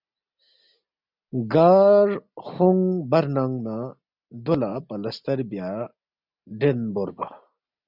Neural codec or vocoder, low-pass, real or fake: none; 5.4 kHz; real